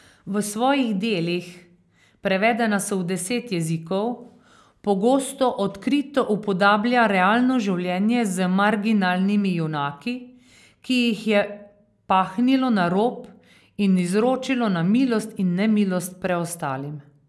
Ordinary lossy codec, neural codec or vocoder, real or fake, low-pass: none; none; real; none